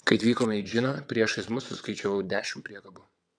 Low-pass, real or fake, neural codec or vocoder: 9.9 kHz; fake; vocoder, 22.05 kHz, 80 mel bands, WaveNeXt